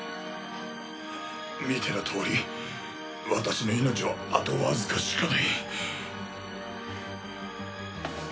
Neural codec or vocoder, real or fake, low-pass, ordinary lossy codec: none; real; none; none